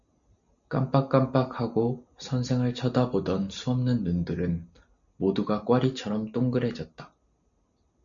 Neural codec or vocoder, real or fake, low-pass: none; real; 7.2 kHz